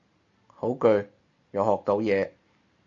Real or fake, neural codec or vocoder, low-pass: real; none; 7.2 kHz